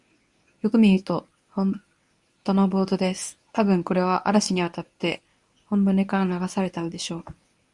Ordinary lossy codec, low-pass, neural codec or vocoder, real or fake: Opus, 64 kbps; 10.8 kHz; codec, 24 kHz, 0.9 kbps, WavTokenizer, medium speech release version 1; fake